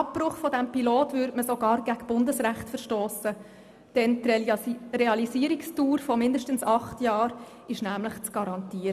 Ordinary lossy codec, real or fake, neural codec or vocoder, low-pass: none; real; none; 14.4 kHz